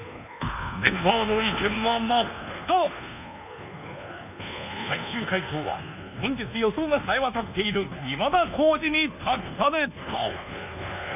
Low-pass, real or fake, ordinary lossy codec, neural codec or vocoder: 3.6 kHz; fake; none; codec, 24 kHz, 1.2 kbps, DualCodec